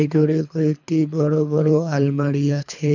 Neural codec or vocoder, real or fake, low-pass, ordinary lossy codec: codec, 24 kHz, 3 kbps, HILCodec; fake; 7.2 kHz; none